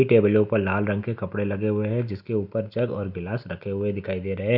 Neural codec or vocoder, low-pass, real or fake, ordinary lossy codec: none; 5.4 kHz; real; none